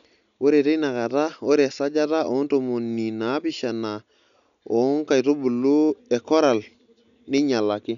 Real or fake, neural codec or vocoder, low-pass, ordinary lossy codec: real; none; 7.2 kHz; none